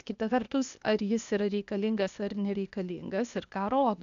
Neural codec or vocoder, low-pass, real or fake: codec, 16 kHz, 0.8 kbps, ZipCodec; 7.2 kHz; fake